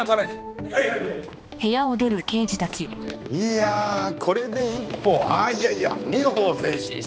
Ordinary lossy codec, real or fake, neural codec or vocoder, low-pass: none; fake; codec, 16 kHz, 2 kbps, X-Codec, HuBERT features, trained on balanced general audio; none